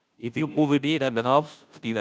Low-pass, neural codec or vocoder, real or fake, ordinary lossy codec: none; codec, 16 kHz, 0.5 kbps, FunCodec, trained on Chinese and English, 25 frames a second; fake; none